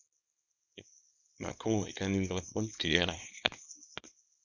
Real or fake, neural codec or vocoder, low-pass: fake; codec, 24 kHz, 0.9 kbps, WavTokenizer, small release; 7.2 kHz